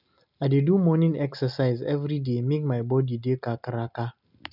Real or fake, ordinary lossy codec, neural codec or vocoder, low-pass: real; none; none; 5.4 kHz